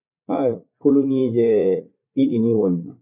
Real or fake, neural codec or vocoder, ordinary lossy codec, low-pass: fake; vocoder, 44.1 kHz, 80 mel bands, Vocos; none; 3.6 kHz